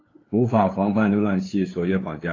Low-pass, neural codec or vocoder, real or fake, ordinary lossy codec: 7.2 kHz; codec, 16 kHz, 8 kbps, FunCodec, trained on LibriTTS, 25 frames a second; fake; AAC, 32 kbps